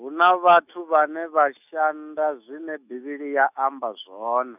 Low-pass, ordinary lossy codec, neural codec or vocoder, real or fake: 3.6 kHz; none; autoencoder, 48 kHz, 128 numbers a frame, DAC-VAE, trained on Japanese speech; fake